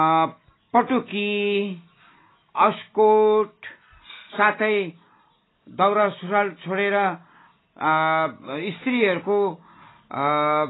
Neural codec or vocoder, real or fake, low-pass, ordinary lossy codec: none; real; 7.2 kHz; AAC, 16 kbps